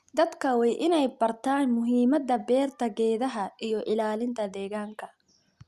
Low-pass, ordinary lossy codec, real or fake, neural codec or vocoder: 14.4 kHz; Opus, 64 kbps; real; none